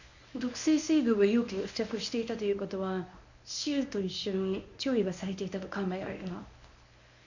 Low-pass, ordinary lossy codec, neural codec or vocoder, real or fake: 7.2 kHz; none; codec, 24 kHz, 0.9 kbps, WavTokenizer, medium speech release version 1; fake